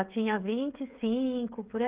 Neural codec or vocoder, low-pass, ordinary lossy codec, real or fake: codec, 16 kHz, 16 kbps, FreqCodec, smaller model; 3.6 kHz; Opus, 32 kbps; fake